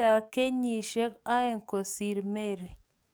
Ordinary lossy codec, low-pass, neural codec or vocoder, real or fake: none; none; codec, 44.1 kHz, 7.8 kbps, DAC; fake